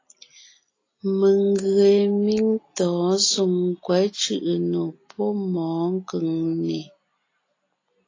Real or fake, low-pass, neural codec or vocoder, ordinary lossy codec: real; 7.2 kHz; none; AAC, 32 kbps